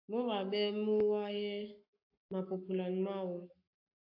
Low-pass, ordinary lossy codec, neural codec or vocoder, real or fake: 5.4 kHz; AAC, 32 kbps; codec, 44.1 kHz, 7.8 kbps, Pupu-Codec; fake